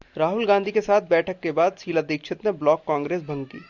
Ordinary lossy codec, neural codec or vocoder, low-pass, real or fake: Opus, 64 kbps; none; 7.2 kHz; real